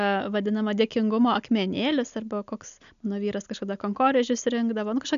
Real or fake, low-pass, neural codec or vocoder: real; 7.2 kHz; none